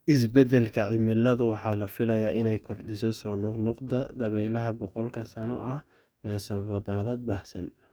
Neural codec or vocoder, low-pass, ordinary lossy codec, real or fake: codec, 44.1 kHz, 2.6 kbps, DAC; none; none; fake